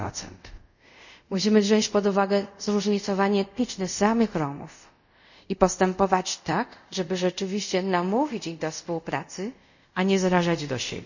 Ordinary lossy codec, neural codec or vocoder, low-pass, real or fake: none; codec, 24 kHz, 0.5 kbps, DualCodec; 7.2 kHz; fake